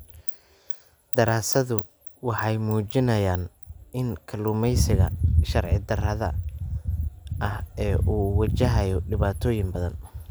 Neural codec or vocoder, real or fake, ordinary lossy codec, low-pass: none; real; none; none